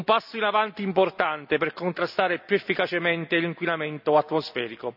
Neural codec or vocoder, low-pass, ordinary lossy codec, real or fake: none; 5.4 kHz; none; real